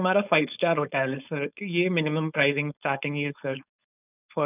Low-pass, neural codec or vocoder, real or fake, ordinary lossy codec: 3.6 kHz; codec, 16 kHz, 4.8 kbps, FACodec; fake; none